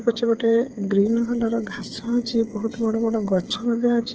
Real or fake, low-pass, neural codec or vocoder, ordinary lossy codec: fake; 7.2 kHz; codec, 16 kHz, 8 kbps, FreqCodec, smaller model; Opus, 16 kbps